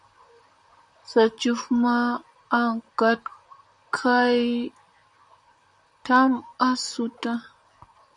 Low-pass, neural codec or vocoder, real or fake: 10.8 kHz; vocoder, 44.1 kHz, 128 mel bands, Pupu-Vocoder; fake